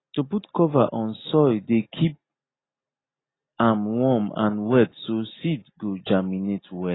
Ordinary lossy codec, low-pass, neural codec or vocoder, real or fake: AAC, 16 kbps; 7.2 kHz; none; real